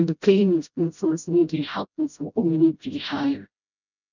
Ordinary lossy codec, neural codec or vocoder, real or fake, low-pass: none; codec, 16 kHz, 0.5 kbps, FreqCodec, smaller model; fake; 7.2 kHz